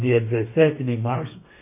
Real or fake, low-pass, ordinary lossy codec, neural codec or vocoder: fake; 3.6 kHz; MP3, 24 kbps; codec, 32 kHz, 1.9 kbps, SNAC